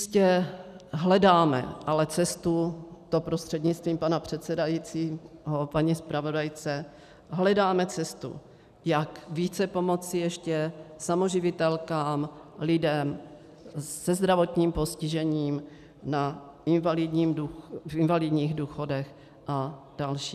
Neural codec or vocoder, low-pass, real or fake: none; 14.4 kHz; real